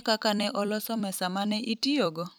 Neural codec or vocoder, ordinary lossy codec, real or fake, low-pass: vocoder, 44.1 kHz, 128 mel bands every 256 samples, BigVGAN v2; none; fake; none